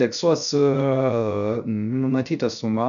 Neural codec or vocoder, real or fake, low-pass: codec, 16 kHz, 0.3 kbps, FocalCodec; fake; 7.2 kHz